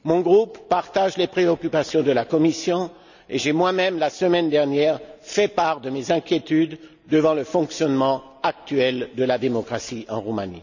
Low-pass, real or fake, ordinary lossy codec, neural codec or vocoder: 7.2 kHz; real; none; none